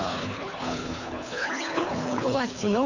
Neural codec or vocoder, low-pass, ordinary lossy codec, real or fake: codec, 24 kHz, 3 kbps, HILCodec; 7.2 kHz; none; fake